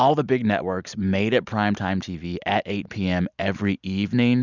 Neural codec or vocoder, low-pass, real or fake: none; 7.2 kHz; real